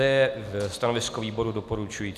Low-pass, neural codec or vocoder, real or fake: 14.4 kHz; none; real